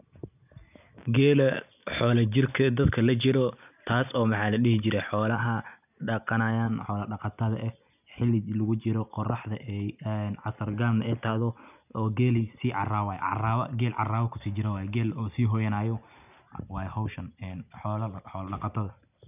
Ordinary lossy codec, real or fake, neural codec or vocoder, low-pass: none; real; none; 3.6 kHz